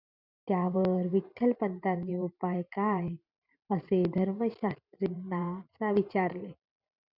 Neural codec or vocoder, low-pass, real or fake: vocoder, 44.1 kHz, 128 mel bands every 512 samples, BigVGAN v2; 5.4 kHz; fake